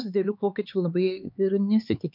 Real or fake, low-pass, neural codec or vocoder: fake; 5.4 kHz; codec, 16 kHz, 4 kbps, X-Codec, HuBERT features, trained on LibriSpeech